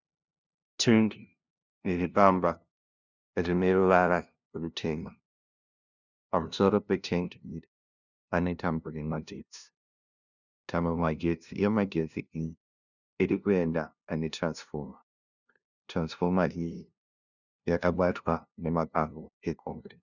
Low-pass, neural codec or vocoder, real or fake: 7.2 kHz; codec, 16 kHz, 0.5 kbps, FunCodec, trained on LibriTTS, 25 frames a second; fake